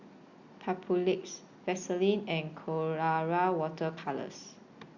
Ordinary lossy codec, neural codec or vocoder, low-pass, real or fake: Opus, 64 kbps; none; 7.2 kHz; real